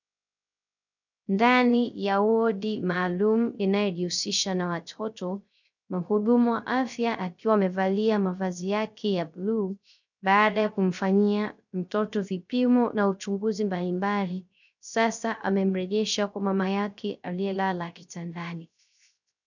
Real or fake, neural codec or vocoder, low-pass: fake; codec, 16 kHz, 0.3 kbps, FocalCodec; 7.2 kHz